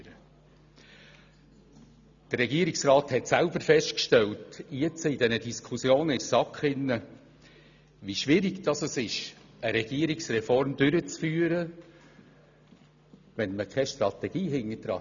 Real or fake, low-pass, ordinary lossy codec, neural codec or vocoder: real; 7.2 kHz; none; none